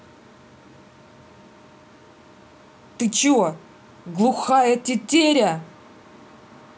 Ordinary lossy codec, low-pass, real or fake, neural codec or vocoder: none; none; real; none